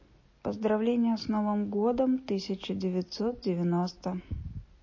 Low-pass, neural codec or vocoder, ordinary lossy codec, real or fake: 7.2 kHz; none; MP3, 32 kbps; real